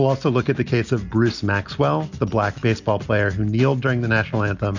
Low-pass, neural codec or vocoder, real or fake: 7.2 kHz; none; real